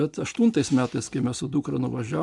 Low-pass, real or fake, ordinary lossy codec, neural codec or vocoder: 10.8 kHz; real; MP3, 96 kbps; none